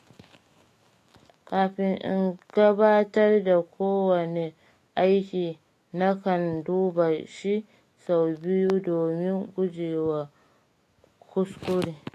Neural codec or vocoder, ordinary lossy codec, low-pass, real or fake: autoencoder, 48 kHz, 128 numbers a frame, DAC-VAE, trained on Japanese speech; AAC, 48 kbps; 14.4 kHz; fake